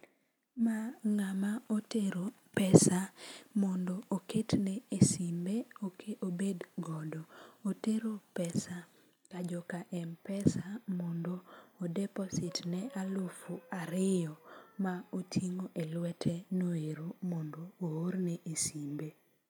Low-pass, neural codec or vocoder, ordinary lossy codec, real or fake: none; none; none; real